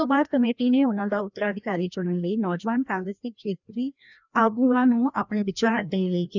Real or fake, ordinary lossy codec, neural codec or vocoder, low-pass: fake; none; codec, 16 kHz, 1 kbps, FreqCodec, larger model; 7.2 kHz